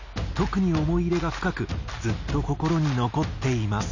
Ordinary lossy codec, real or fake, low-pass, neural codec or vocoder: none; real; 7.2 kHz; none